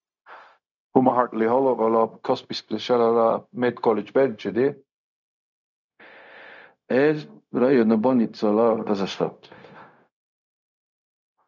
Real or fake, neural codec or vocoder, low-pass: fake; codec, 16 kHz, 0.4 kbps, LongCat-Audio-Codec; 7.2 kHz